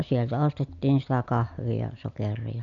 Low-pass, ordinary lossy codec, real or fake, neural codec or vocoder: 7.2 kHz; none; real; none